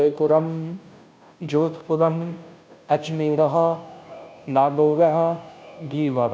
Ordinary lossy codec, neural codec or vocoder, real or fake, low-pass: none; codec, 16 kHz, 0.5 kbps, FunCodec, trained on Chinese and English, 25 frames a second; fake; none